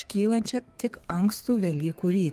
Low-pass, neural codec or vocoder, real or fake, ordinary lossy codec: 14.4 kHz; codec, 32 kHz, 1.9 kbps, SNAC; fake; Opus, 32 kbps